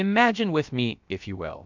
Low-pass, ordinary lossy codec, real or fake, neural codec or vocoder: 7.2 kHz; MP3, 64 kbps; fake; codec, 16 kHz, about 1 kbps, DyCAST, with the encoder's durations